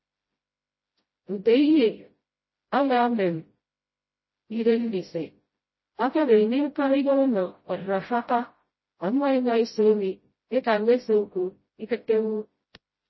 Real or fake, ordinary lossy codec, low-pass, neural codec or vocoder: fake; MP3, 24 kbps; 7.2 kHz; codec, 16 kHz, 0.5 kbps, FreqCodec, smaller model